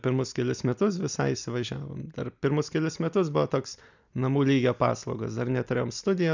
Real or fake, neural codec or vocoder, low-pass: real; none; 7.2 kHz